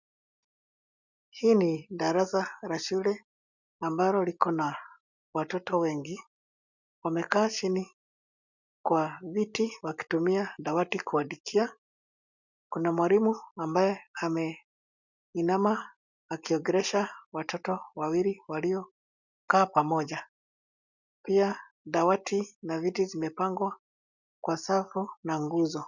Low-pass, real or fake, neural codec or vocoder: 7.2 kHz; real; none